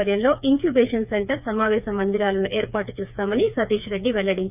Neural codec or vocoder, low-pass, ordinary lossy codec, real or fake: codec, 16 kHz, 4 kbps, FreqCodec, smaller model; 3.6 kHz; none; fake